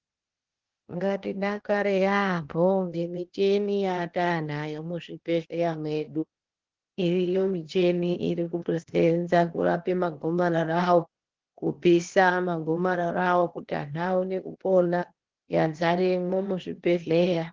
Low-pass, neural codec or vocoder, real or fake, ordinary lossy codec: 7.2 kHz; codec, 16 kHz, 0.8 kbps, ZipCodec; fake; Opus, 16 kbps